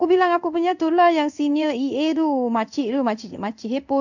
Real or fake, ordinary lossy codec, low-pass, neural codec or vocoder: fake; none; 7.2 kHz; codec, 16 kHz in and 24 kHz out, 1 kbps, XY-Tokenizer